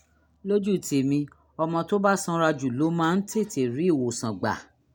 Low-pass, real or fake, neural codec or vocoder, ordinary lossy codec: none; real; none; none